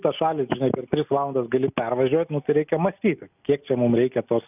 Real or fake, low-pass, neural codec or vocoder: real; 3.6 kHz; none